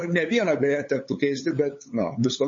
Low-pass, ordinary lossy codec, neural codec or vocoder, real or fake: 7.2 kHz; MP3, 32 kbps; codec, 16 kHz, 8 kbps, FunCodec, trained on LibriTTS, 25 frames a second; fake